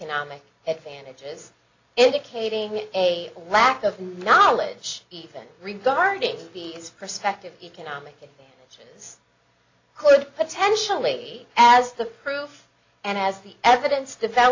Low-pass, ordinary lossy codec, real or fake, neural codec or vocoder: 7.2 kHz; AAC, 48 kbps; real; none